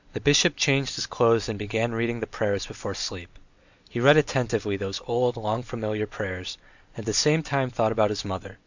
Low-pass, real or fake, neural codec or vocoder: 7.2 kHz; real; none